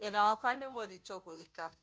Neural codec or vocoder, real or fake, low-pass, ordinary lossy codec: codec, 16 kHz, 0.5 kbps, FunCodec, trained on Chinese and English, 25 frames a second; fake; none; none